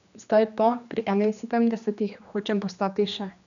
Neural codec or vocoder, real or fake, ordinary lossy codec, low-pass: codec, 16 kHz, 2 kbps, X-Codec, HuBERT features, trained on general audio; fake; none; 7.2 kHz